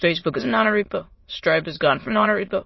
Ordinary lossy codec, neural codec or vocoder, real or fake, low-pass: MP3, 24 kbps; autoencoder, 22.05 kHz, a latent of 192 numbers a frame, VITS, trained on many speakers; fake; 7.2 kHz